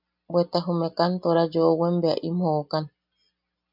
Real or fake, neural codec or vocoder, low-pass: real; none; 5.4 kHz